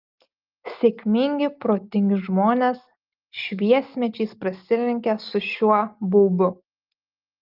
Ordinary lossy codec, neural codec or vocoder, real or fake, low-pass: Opus, 24 kbps; none; real; 5.4 kHz